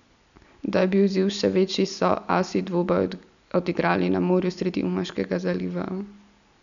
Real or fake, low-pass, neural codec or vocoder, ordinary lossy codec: real; 7.2 kHz; none; none